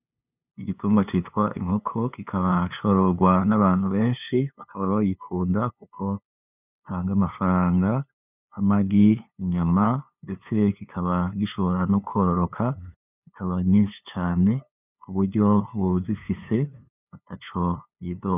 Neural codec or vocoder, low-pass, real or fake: codec, 16 kHz, 2 kbps, FunCodec, trained on LibriTTS, 25 frames a second; 3.6 kHz; fake